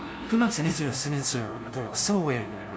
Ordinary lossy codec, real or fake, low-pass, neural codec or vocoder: none; fake; none; codec, 16 kHz, 0.5 kbps, FunCodec, trained on LibriTTS, 25 frames a second